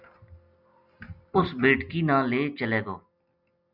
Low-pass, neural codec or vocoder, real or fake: 5.4 kHz; none; real